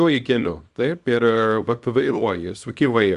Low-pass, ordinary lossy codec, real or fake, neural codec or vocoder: 10.8 kHz; Opus, 64 kbps; fake; codec, 24 kHz, 0.9 kbps, WavTokenizer, small release